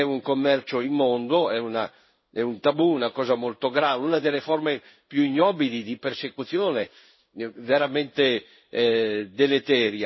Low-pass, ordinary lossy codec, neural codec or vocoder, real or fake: 7.2 kHz; MP3, 24 kbps; codec, 16 kHz in and 24 kHz out, 1 kbps, XY-Tokenizer; fake